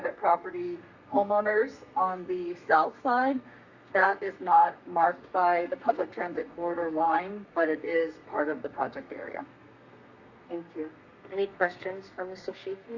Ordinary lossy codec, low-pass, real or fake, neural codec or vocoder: Opus, 64 kbps; 7.2 kHz; fake; codec, 32 kHz, 1.9 kbps, SNAC